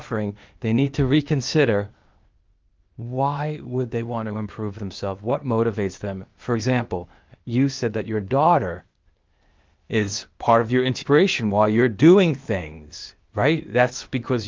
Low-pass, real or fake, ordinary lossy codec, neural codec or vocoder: 7.2 kHz; fake; Opus, 32 kbps; codec, 16 kHz, 0.8 kbps, ZipCodec